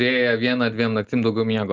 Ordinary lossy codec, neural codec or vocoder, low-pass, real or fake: Opus, 24 kbps; none; 7.2 kHz; real